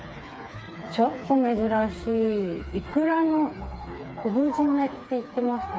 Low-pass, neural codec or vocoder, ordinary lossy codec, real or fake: none; codec, 16 kHz, 4 kbps, FreqCodec, smaller model; none; fake